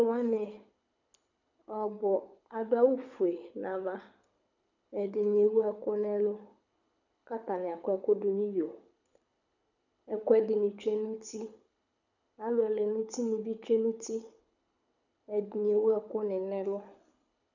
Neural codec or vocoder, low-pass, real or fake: codec, 24 kHz, 6 kbps, HILCodec; 7.2 kHz; fake